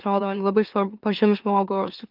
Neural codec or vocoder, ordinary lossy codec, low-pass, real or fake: autoencoder, 44.1 kHz, a latent of 192 numbers a frame, MeloTTS; Opus, 24 kbps; 5.4 kHz; fake